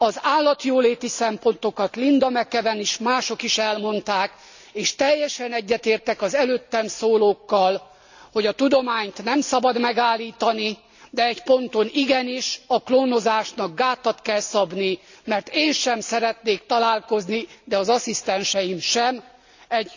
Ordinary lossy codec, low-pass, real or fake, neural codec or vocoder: none; 7.2 kHz; real; none